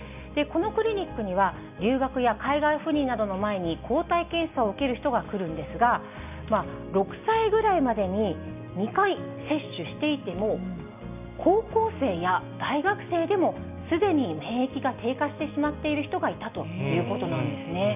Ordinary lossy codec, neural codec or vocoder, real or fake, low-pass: none; none; real; 3.6 kHz